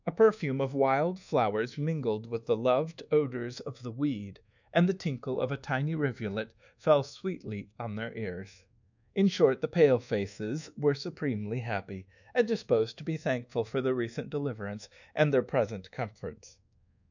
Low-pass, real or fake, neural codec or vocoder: 7.2 kHz; fake; codec, 24 kHz, 1.2 kbps, DualCodec